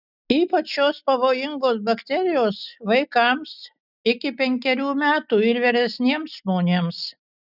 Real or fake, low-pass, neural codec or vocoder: real; 5.4 kHz; none